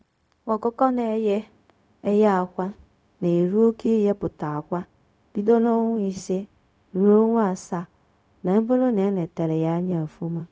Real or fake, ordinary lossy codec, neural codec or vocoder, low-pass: fake; none; codec, 16 kHz, 0.4 kbps, LongCat-Audio-Codec; none